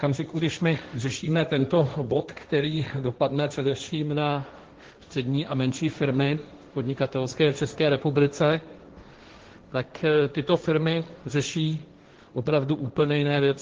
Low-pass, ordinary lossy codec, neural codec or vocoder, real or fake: 7.2 kHz; Opus, 16 kbps; codec, 16 kHz, 1.1 kbps, Voila-Tokenizer; fake